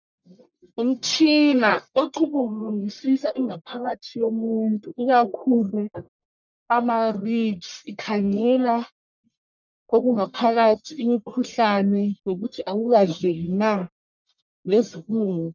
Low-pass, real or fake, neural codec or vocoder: 7.2 kHz; fake; codec, 44.1 kHz, 1.7 kbps, Pupu-Codec